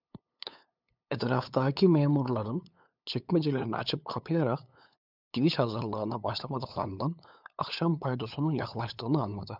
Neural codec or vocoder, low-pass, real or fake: codec, 16 kHz, 8 kbps, FunCodec, trained on LibriTTS, 25 frames a second; 5.4 kHz; fake